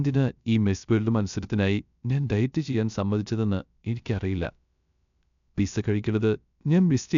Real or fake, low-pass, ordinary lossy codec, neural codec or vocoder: fake; 7.2 kHz; none; codec, 16 kHz, 0.3 kbps, FocalCodec